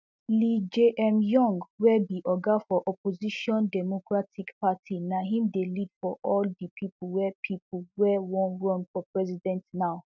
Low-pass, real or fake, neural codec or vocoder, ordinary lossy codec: none; real; none; none